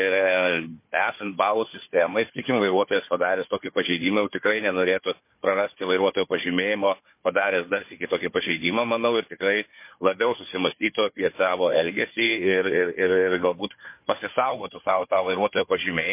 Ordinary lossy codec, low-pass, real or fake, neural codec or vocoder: MP3, 24 kbps; 3.6 kHz; fake; codec, 16 kHz, 4 kbps, FunCodec, trained on Chinese and English, 50 frames a second